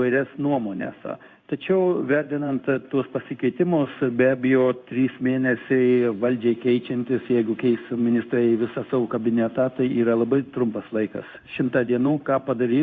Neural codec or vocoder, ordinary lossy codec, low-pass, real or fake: codec, 16 kHz in and 24 kHz out, 1 kbps, XY-Tokenizer; Opus, 64 kbps; 7.2 kHz; fake